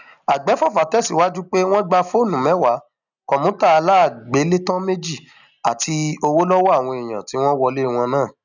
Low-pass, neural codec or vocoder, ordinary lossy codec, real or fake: 7.2 kHz; none; none; real